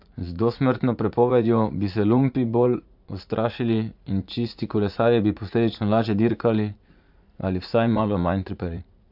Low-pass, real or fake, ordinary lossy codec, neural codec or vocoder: 5.4 kHz; fake; AAC, 48 kbps; vocoder, 44.1 kHz, 80 mel bands, Vocos